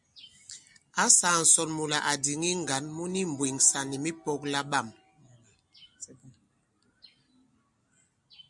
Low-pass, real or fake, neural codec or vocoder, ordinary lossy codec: 10.8 kHz; real; none; MP3, 96 kbps